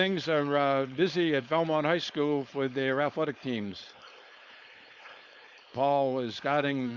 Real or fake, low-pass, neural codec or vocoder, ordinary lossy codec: fake; 7.2 kHz; codec, 16 kHz, 4.8 kbps, FACodec; Opus, 64 kbps